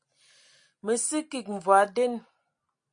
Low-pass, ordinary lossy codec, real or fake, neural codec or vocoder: 9.9 kHz; MP3, 48 kbps; real; none